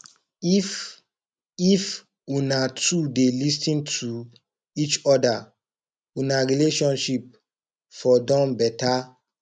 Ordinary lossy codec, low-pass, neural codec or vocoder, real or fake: none; 9.9 kHz; none; real